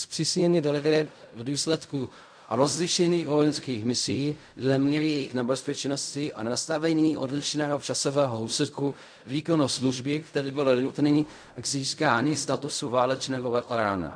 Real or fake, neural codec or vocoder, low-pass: fake; codec, 16 kHz in and 24 kHz out, 0.4 kbps, LongCat-Audio-Codec, fine tuned four codebook decoder; 9.9 kHz